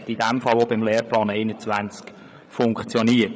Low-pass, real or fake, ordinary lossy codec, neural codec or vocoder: none; fake; none; codec, 16 kHz, 8 kbps, FreqCodec, larger model